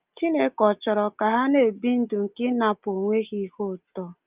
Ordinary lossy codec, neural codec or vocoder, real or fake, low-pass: Opus, 24 kbps; none; real; 3.6 kHz